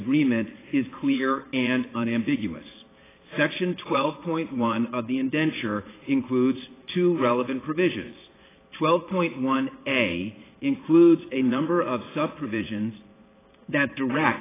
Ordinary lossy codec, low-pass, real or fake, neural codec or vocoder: AAC, 16 kbps; 3.6 kHz; fake; vocoder, 22.05 kHz, 80 mel bands, Vocos